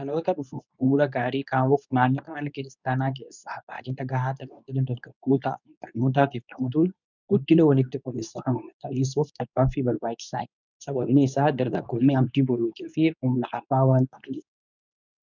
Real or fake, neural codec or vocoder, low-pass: fake; codec, 24 kHz, 0.9 kbps, WavTokenizer, medium speech release version 2; 7.2 kHz